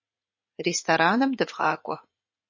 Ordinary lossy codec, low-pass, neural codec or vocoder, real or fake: MP3, 32 kbps; 7.2 kHz; vocoder, 44.1 kHz, 80 mel bands, Vocos; fake